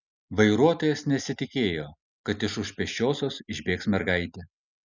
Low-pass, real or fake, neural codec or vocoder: 7.2 kHz; real; none